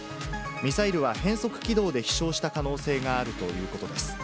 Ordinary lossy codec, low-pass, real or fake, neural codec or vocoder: none; none; real; none